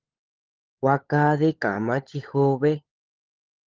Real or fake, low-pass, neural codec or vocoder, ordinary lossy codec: fake; 7.2 kHz; codec, 16 kHz, 16 kbps, FunCodec, trained on LibriTTS, 50 frames a second; Opus, 16 kbps